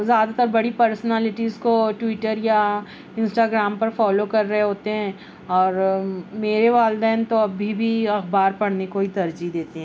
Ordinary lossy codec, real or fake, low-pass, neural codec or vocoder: none; real; none; none